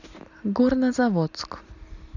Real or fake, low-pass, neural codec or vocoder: real; 7.2 kHz; none